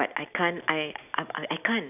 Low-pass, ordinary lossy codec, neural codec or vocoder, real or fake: 3.6 kHz; none; none; real